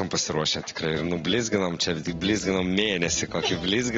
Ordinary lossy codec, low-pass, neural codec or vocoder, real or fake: AAC, 24 kbps; 7.2 kHz; none; real